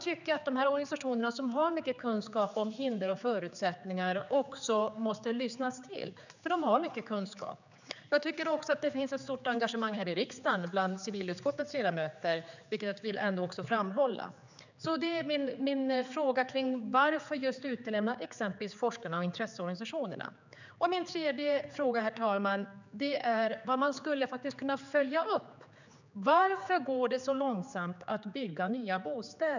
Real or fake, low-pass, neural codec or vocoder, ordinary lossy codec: fake; 7.2 kHz; codec, 16 kHz, 4 kbps, X-Codec, HuBERT features, trained on general audio; none